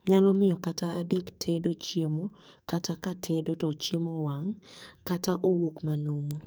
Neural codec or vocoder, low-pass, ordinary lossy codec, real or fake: codec, 44.1 kHz, 2.6 kbps, SNAC; none; none; fake